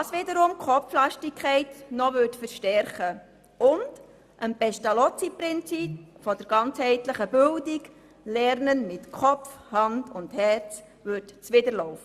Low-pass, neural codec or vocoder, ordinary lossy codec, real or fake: 14.4 kHz; none; Opus, 64 kbps; real